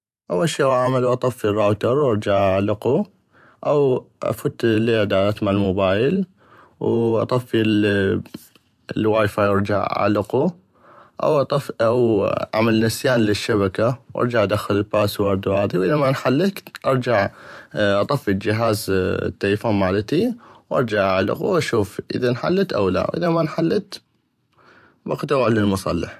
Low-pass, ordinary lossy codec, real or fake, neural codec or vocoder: 14.4 kHz; none; fake; vocoder, 44.1 kHz, 128 mel bands every 512 samples, BigVGAN v2